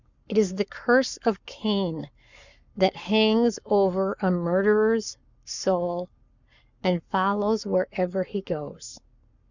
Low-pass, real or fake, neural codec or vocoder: 7.2 kHz; fake; codec, 44.1 kHz, 7.8 kbps, Pupu-Codec